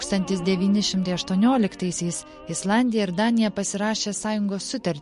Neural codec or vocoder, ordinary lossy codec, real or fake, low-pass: none; MP3, 48 kbps; real; 14.4 kHz